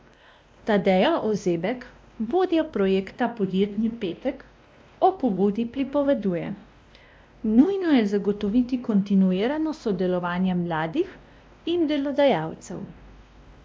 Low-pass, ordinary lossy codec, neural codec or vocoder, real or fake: none; none; codec, 16 kHz, 1 kbps, X-Codec, WavLM features, trained on Multilingual LibriSpeech; fake